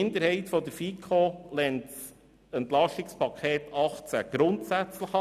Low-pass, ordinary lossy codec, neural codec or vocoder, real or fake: 14.4 kHz; none; none; real